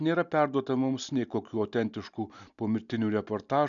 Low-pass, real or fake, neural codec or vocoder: 7.2 kHz; real; none